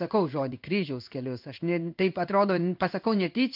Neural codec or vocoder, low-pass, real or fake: codec, 16 kHz in and 24 kHz out, 1 kbps, XY-Tokenizer; 5.4 kHz; fake